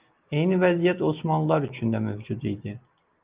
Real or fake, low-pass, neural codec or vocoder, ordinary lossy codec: real; 3.6 kHz; none; Opus, 24 kbps